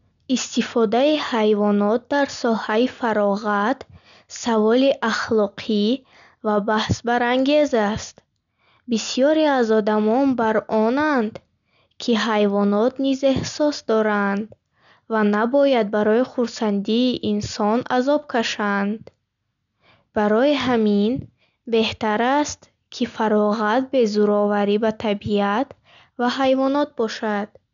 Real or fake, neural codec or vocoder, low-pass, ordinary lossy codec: real; none; 7.2 kHz; none